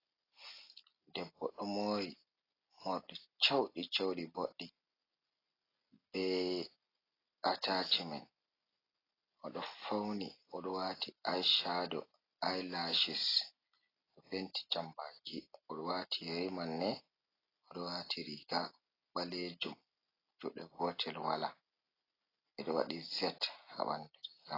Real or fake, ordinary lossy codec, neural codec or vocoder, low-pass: real; AAC, 24 kbps; none; 5.4 kHz